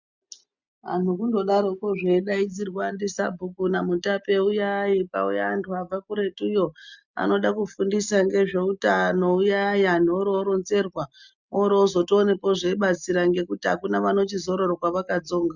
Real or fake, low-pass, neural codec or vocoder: real; 7.2 kHz; none